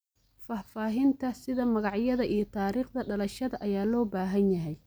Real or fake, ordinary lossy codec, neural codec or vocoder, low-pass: real; none; none; none